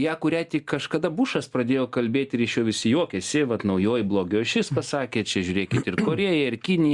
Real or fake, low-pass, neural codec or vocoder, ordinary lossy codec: real; 10.8 kHz; none; Opus, 64 kbps